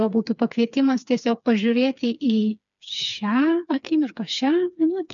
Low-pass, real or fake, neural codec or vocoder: 7.2 kHz; fake; codec, 16 kHz, 4 kbps, FreqCodec, smaller model